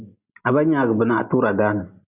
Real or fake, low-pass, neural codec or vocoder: real; 3.6 kHz; none